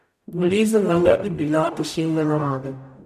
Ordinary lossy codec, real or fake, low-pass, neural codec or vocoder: none; fake; 14.4 kHz; codec, 44.1 kHz, 0.9 kbps, DAC